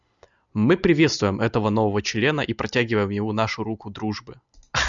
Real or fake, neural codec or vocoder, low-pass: real; none; 7.2 kHz